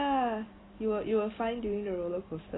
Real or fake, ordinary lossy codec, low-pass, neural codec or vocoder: real; AAC, 16 kbps; 7.2 kHz; none